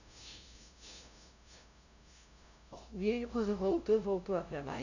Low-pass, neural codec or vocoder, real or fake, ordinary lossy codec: 7.2 kHz; codec, 16 kHz, 0.5 kbps, FunCodec, trained on LibriTTS, 25 frames a second; fake; none